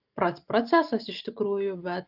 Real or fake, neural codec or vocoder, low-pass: real; none; 5.4 kHz